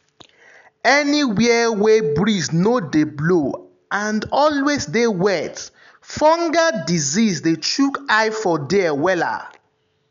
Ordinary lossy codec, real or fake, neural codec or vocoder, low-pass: none; real; none; 7.2 kHz